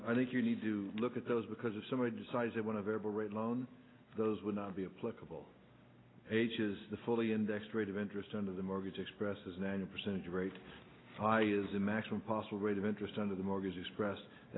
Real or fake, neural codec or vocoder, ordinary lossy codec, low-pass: real; none; AAC, 16 kbps; 7.2 kHz